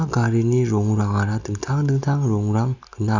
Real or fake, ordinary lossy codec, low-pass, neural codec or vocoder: real; none; 7.2 kHz; none